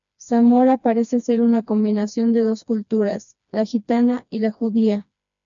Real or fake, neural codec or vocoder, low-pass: fake; codec, 16 kHz, 2 kbps, FreqCodec, smaller model; 7.2 kHz